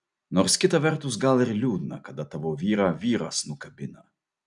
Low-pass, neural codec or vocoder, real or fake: 10.8 kHz; none; real